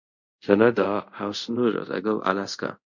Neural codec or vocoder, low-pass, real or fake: codec, 24 kHz, 0.5 kbps, DualCodec; 7.2 kHz; fake